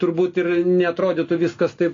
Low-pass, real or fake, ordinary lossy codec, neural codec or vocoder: 7.2 kHz; real; AAC, 32 kbps; none